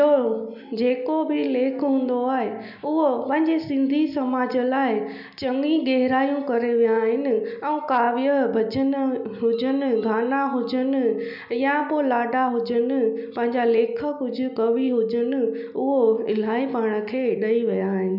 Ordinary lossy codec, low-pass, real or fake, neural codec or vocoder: none; 5.4 kHz; real; none